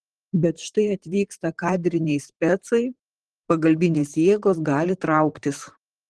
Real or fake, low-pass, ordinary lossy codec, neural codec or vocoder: fake; 10.8 kHz; Opus, 16 kbps; vocoder, 44.1 kHz, 128 mel bands, Pupu-Vocoder